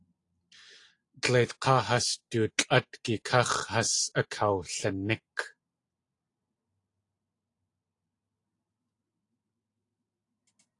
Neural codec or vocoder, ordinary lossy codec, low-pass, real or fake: none; AAC, 48 kbps; 10.8 kHz; real